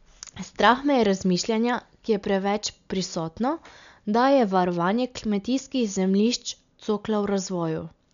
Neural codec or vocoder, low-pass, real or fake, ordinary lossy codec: none; 7.2 kHz; real; none